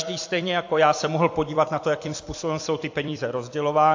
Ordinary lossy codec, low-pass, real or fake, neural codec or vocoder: AAC, 48 kbps; 7.2 kHz; fake; vocoder, 44.1 kHz, 128 mel bands every 256 samples, BigVGAN v2